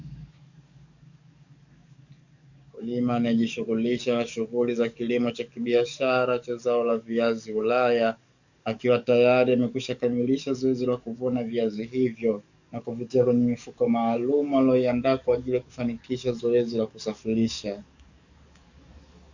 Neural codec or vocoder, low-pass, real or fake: codec, 44.1 kHz, 7.8 kbps, DAC; 7.2 kHz; fake